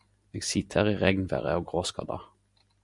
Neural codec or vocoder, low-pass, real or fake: none; 10.8 kHz; real